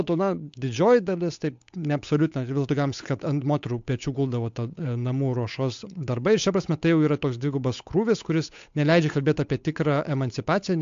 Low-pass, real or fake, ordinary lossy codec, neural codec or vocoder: 7.2 kHz; fake; AAC, 64 kbps; codec, 16 kHz, 8 kbps, FunCodec, trained on Chinese and English, 25 frames a second